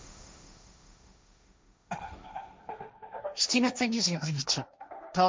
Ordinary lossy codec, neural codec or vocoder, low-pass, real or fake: none; codec, 16 kHz, 1.1 kbps, Voila-Tokenizer; none; fake